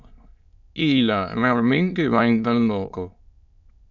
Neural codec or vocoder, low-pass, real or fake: autoencoder, 22.05 kHz, a latent of 192 numbers a frame, VITS, trained on many speakers; 7.2 kHz; fake